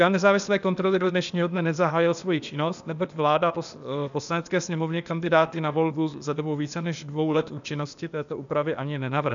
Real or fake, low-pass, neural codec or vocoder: fake; 7.2 kHz; codec, 16 kHz, 0.8 kbps, ZipCodec